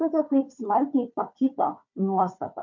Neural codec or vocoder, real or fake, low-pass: codec, 16 kHz, 4 kbps, FunCodec, trained on Chinese and English, 50 frames a second; fake; 7.2 kHz